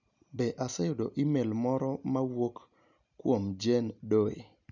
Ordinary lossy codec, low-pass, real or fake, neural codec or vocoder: none; 7.2 kHz; real; none